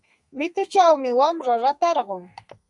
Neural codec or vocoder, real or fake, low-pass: codec, 32 kHz, 1.9 kbps, SNAC; fake; 10.8 kHz